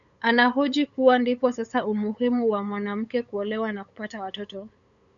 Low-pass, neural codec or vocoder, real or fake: 7.2 kHz; codec, 16 kHz, 8 kbps, FunCodec, trained on LibriTTS, 25 frames a second; fake